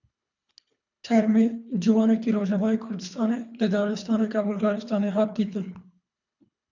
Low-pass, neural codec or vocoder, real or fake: 7.2 kHz; codec, 24 kHz, 3 kbps, HILCodec; fake